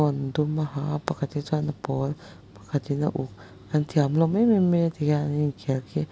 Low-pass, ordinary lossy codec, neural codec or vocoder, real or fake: none; none; none; real